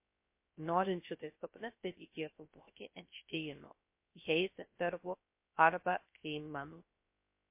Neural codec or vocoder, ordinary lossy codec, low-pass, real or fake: codec, 16 kHz, 0.3 kbps, FocalCodec; MP3, 24 kbps; 3.6 kHz; fake